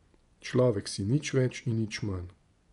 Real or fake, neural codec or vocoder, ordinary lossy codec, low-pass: fake; vocoder, 24 kHz, 100 mel bands, Vocos; none; 10.8 kHz